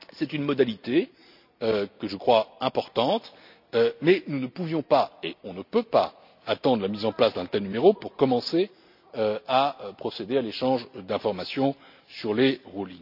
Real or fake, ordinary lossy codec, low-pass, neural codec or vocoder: real; none; 5.4 kHz; none